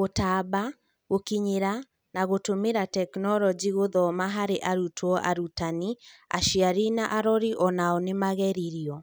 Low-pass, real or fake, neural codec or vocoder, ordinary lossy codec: none; real; none; none